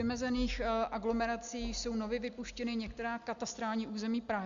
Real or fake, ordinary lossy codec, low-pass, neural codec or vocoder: real; Opus, 64 kbps; 7.2 kHz; none